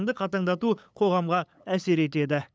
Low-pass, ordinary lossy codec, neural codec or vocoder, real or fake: none; none; codec, 16 kHz, 8 kbps, FunCodec, trained on LibriTTS, 25 frames a second; fake